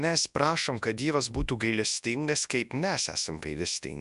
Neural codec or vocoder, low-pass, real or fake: codec, 24 kHz, 0.9 kbps, WavTokenizer, large speech release; 10.8 kHz; fake